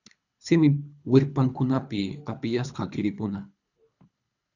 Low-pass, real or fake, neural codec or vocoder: 7.2 kHz; fake; codec, 24 kHz, 3 kbps, HILCodec